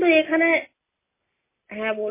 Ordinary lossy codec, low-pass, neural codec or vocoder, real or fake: MP3, 16 kbps; 3.6 kHz; none; real